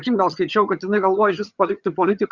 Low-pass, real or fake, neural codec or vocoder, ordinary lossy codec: 7.2 kHz; fake; vocoder, 22.05 kHz, 80 mel bands, HiFi-GAN; Opus, 64 kbps